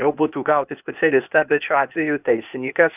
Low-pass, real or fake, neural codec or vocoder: 3.6 kHz; fake; codec, 16 kHz, 0.8 kbps, ZipCodec